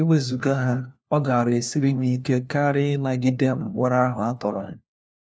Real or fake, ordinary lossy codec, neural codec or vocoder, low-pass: fake; none; codec, 16 kHz, 1 kbps, FunCodec, trained on LibriTTS, 50 frames a second; none